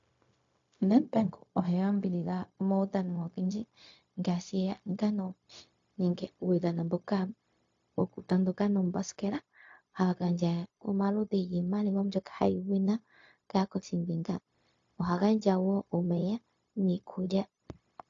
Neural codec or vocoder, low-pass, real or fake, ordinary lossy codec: codec, 16 kHz, 0.4 kbps, LongCat-Audio-Codec; 7.2 kHz; fake; AAC, 48 kbps